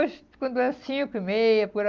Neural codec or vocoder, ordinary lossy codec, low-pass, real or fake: none; Opus, 24 kbps; 7.2 kHz; real